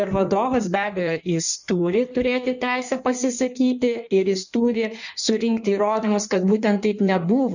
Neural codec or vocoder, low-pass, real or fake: codec, 16 kHz in and 24 kHz out, 1.1 kbps, FireRedTTS-2 codec; 7.2 kHz; fake